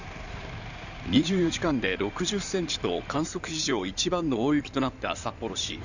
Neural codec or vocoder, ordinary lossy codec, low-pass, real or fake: codec, 16 kHz in and 24 kHz out, 2.2 kbps, FireRedTTS-2 codec; none; 7.2 kHz; fake